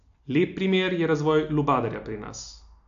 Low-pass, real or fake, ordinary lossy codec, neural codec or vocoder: 7.2 kHz; real; AAC, 64 kbps; none